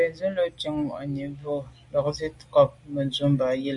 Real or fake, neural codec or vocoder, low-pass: real; none; 10.8 kHz